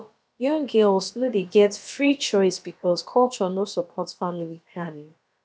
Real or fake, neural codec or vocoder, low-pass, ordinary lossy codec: fake; codec, 16 kHz, about 1 kbps, DyCAST, with the encoder's durations; none; none